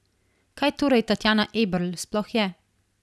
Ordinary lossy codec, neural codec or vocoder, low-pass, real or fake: none; none; none; real